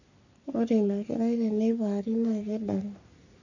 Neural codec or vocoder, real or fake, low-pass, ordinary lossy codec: codec, 44.1 kHz, 3.4 kbps, Pupu-Codec; fake; 7.2 kHz; none